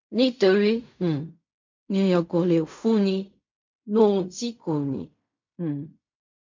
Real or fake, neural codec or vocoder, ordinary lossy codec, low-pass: fake; codec, 16 kHz in and 24 kHz out, 0.4 kbps, LongCat-Audio-Codec, fine tuned four codebook decoder; MP3, 48 kbps; 7.2 kHz